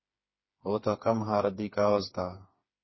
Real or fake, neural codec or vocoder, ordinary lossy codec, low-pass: fake; codec, 16 kHz, 4 kbps, FreqCodec, smaller model; MP3, 24 kbps; 7.2 kHz